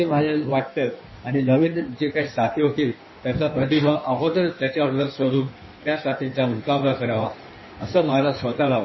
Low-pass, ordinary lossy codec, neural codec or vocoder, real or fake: 7.2 kHz; MP3, 24 kbps; codec, 16 kHz in and 24 kHz out, 1.1 kbps, FireRedTTS-2 codec; fake